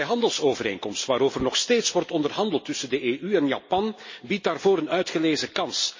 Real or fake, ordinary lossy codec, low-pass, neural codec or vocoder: real; MP3, 32 kbps; 7.2 kHz; none